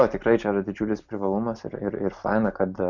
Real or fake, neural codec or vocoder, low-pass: real; none; 7.2 kHz